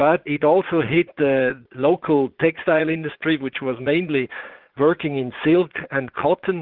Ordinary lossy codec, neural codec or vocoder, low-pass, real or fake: Opus, 16 kbps; vocoder, 44.1 kHz, 80 mel bands, Vocos; 5.4 kHz; fake